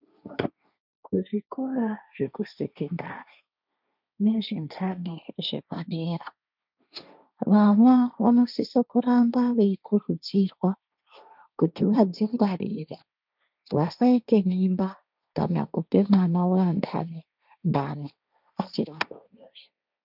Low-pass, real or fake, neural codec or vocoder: 5.4 kHz; fake; codec, 16 kHz, 1.1 kbps, Voila-Tokenizer